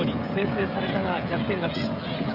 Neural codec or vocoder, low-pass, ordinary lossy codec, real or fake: codec, 16 kHz, 16 kbps, FreqCodec, smaller model; 5.4 kHz; none; fake